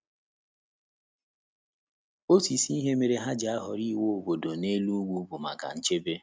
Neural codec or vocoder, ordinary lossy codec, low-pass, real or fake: none; none; none; real